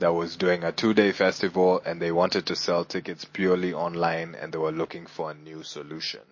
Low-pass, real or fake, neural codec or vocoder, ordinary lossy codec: 7.2 kHz; real; none; MP3, 32 kbps